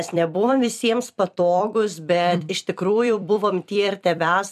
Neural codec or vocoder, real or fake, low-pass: vocoder, 44.1 kHz, 128 mel bands every 256 samples, BigVGAN v2; fake; 14.4 kHz